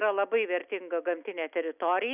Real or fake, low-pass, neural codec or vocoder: real; 3.6 kHz; none